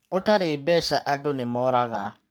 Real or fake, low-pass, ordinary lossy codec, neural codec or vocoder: fake; none; none; codec, 44.1 kHz, 3.4 kbps, Pupu-Codec